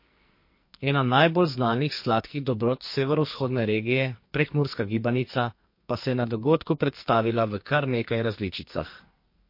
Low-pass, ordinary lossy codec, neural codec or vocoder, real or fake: 5.4 kHz; MP3, 32 kbps; codec, 44.1 kHz, 2.6 kbps, SNAC; fake